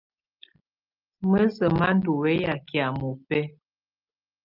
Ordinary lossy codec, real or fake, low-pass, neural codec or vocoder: Opus, 24 kbps; real; 5.4 kHz; none